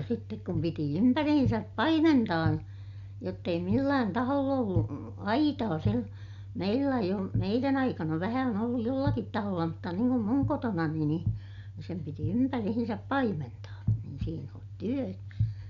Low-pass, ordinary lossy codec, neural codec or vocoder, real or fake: 7.2 kHz; none; none; real